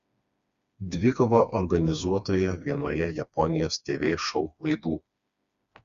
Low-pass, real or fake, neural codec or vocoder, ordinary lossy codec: 7.2 kHz; fake; codec, 16 kHz, 2 kbps, FreqCodec, smaller model; Opus, 64 kbps